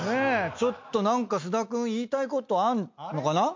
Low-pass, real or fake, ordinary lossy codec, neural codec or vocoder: 7.2 kHz; real; MP3, 48 kbps; none